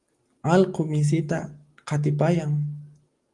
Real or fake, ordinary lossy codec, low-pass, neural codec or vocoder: real; Opus, 24 kbps; 10.8 kHz; none